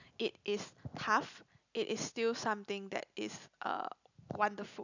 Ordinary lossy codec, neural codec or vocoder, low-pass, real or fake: none; none; 7.2 kHz; real